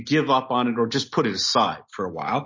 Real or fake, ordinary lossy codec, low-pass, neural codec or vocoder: real; MP3, 32 kbps; 7.2 kHz; none